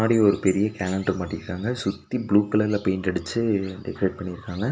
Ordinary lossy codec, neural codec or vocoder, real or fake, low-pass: none; none; real; none